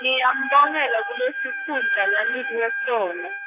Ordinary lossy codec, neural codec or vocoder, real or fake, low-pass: AAC, 24 kbps; codec, 16 kHz, 4 kbps, X-Codec, HuBERT features, trained on general audio; fake; 3.6 kHz